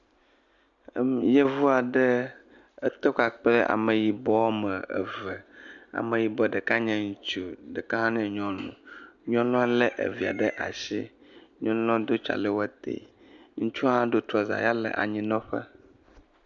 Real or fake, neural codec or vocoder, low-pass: real; none; 7.2 kHz